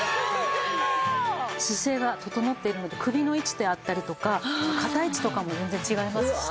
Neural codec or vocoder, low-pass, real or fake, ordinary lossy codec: none; none; real; none